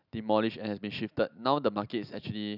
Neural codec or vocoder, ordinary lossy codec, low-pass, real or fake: none; none; 5.4 kHz; real